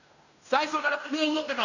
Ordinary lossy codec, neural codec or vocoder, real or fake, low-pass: none; codec, 16 kHz in and 24 kHz out, 0.9 kbps, LongCat-Audio-Codec, fine tuned four codebook decoder; fake; 7.2 kHz